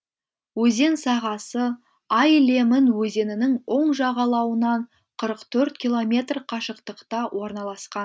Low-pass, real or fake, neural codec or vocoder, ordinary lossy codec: none; real; none; none